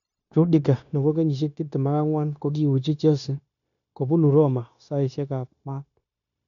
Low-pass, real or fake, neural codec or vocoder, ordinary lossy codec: 7.2 kHz; fake; codec, 16 kHz, 0.9 kbps, LongCat-Audio-Codec; none